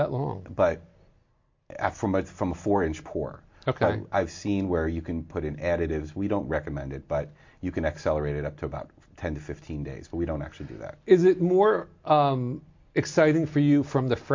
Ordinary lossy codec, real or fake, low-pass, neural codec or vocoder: MP3, 48 kbps; real; 7.2 kHz; none